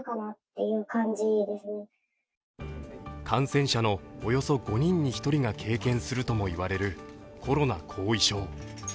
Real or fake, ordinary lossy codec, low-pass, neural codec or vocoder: real; none; none; none